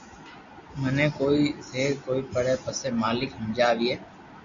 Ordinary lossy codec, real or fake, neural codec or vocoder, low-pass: Opus, 64 kbps; real; none; 7.2 kHz